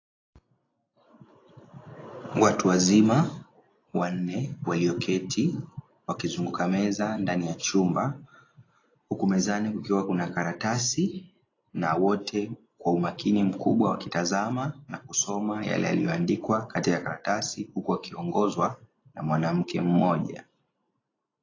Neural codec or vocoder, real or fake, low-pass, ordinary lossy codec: none; real; 7.2 kHz; AAC, 32 kbps